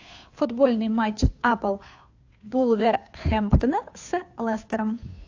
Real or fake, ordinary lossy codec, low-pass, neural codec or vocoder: fake; AAC, 48 kbps; 7.2 kHz; codec, 16 kHz, 2 kbps, FunCodec, trained on Chinese and English, 25 frames a second